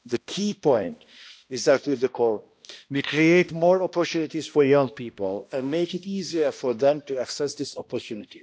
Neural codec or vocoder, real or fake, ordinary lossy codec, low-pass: codec, 16 kHz, 1 kbps, X-Codec, HuBERT features, trained on balanced general audio; fake; none; none